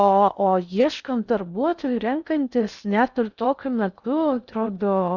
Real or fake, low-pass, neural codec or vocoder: fake; 7.2 kHz; codec, 16 kHz in and 24 kHz out, 0.6 kbps, FocalCodec, streaming, 4096 codes